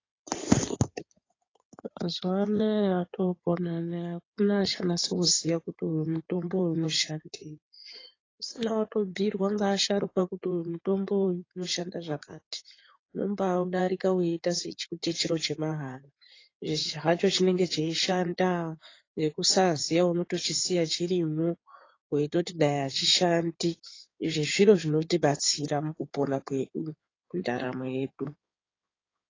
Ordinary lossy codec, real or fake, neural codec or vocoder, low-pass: AAC, 32 kbps; fake; codec, 16 kHz in and 24 kHz out, 2.2 kbps, FireRedTTS-2 codec; 7.2 kHz